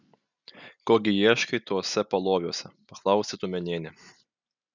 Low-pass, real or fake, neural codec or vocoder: 7.2 kHz; real; none